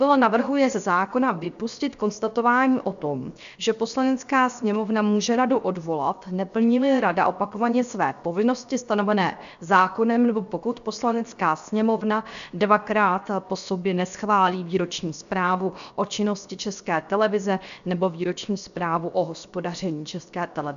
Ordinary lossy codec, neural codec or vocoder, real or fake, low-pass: AAC, 96 kbps; codec, 16 kHz, 0.7 kbps, FocalCodec; fake; 7.2 kHz